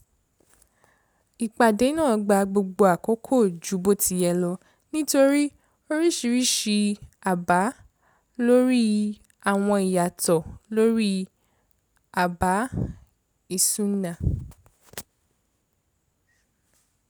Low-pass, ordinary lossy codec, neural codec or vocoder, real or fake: none; none; none; real